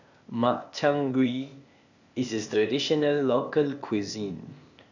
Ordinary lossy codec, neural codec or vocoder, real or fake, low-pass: none; codec, 16 kHz, 0.8 kbps, ZipCodec; fake; 7.2 kHz